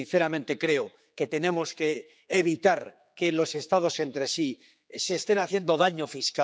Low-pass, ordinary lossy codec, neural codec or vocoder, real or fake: none; none; codec, 16 kHz, 4 kbps, X-Codec, HuBERT features, trained on general audio; fake